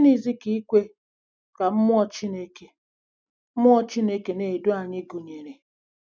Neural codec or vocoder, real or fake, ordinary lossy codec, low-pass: none; real; none; none